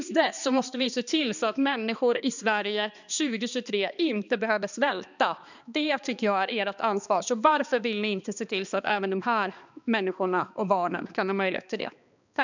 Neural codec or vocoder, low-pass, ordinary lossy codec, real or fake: codec, 16 kHz, 2 kbps, X-Codec, HuBERT features, trained on balanced general audio; 7.2 kHz; none; fake